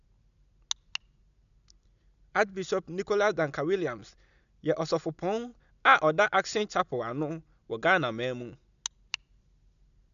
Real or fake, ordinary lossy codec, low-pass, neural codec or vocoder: real; none; 7.2 kHz; none